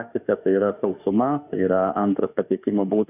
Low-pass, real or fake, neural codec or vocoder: 3.6 kHz; fake; autoencoder, 48 kHz, 32 numbers a frame, DAC-VAE, trained on Japanese speech